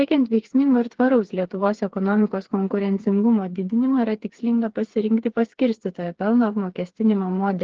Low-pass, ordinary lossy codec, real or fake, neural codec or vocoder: 7.2 kHz; Opus, 16 kbps; fake; codec, 16 kHz, 4 kbps, FreqCodec, smaller model